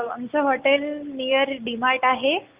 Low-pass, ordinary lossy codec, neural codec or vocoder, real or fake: 3.6 kHz; Opus, 24 kbps; none; real